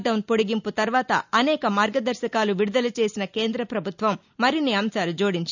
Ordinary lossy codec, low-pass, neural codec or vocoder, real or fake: none; 7.2 kHz; none; real